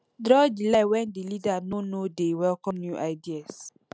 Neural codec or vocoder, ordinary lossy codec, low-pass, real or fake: none; none; none; real